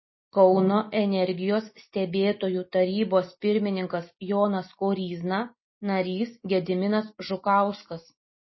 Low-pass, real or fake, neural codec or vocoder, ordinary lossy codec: 7.2 kHz; real; none; MP3, 24 kbps